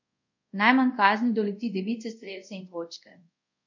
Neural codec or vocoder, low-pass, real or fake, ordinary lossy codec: codec, 24 kHz, 0.5 kbps, DualCodec; 7.2 kHz; fake; none